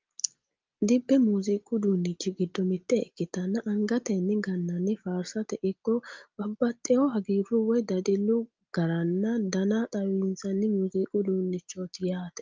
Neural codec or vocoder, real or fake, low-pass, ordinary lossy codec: none; real; 7.2 kHz; Opus, 32 kbps